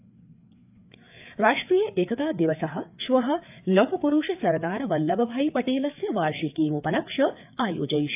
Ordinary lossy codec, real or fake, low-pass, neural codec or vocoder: AAC, 32 kbps; fake; 3.6 kHz; codec, 16 kHz, 4 kbps, FreqCodec, larger model